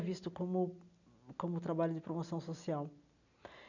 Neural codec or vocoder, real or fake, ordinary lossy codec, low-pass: none; real; none; 7.2 kHz